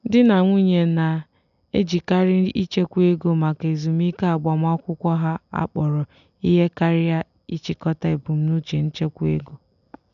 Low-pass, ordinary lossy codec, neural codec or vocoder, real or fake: 7.2 kHz; none; none; real